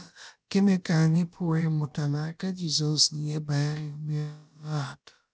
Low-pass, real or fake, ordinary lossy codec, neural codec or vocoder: none; fake; none; codec, 16 kHz, about 1 kbps, DyCAST, with the encoder's durations